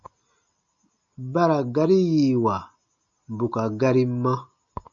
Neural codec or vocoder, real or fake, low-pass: none; real; 7.2 kHz